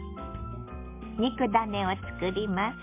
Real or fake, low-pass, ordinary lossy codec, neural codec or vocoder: real; 3.6 kHz; MP3, 24 kbps; none